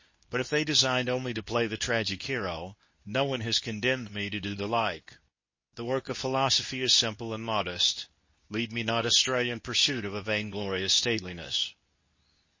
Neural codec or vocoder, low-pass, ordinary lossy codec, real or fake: codec, 24 kHz, 0.9 kbps, WavTokenizer, medium speech release version 2; 7.2 kHz; MP3, 32 kbps; fake